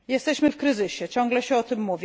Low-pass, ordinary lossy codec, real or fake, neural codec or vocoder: none; none; real; none